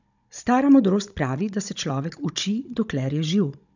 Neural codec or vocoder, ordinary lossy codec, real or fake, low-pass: codec, 16 kHz, 16 kbps, FunCodec, trained on Chinese and English, 50 frames a second; none; fake; 7.2 kHz